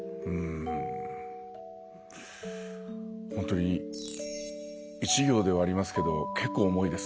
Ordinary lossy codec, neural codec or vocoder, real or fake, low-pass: none; none; real; none